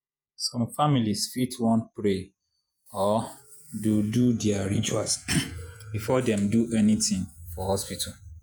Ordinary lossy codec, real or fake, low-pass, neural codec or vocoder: none; fake; none; vocoder, 48 kHz, 128 mel bands, Vocos